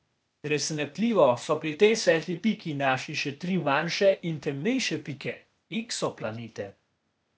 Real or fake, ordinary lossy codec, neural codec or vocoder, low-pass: fake; none; codec, 16 kHz, 0.8 kbps, ZipCodec; none